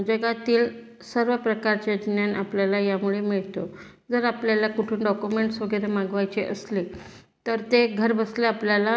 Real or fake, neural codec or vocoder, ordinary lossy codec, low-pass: real; none; none; none